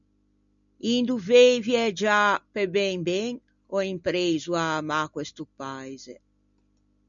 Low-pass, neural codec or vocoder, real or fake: 7.2 kHz; none; real